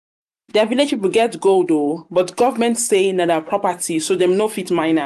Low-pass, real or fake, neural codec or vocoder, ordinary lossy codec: 14.4 kHz; fake; vocoder, 44.1 kHz, 128 mel bands every 512 samples, BigVGAN v2; none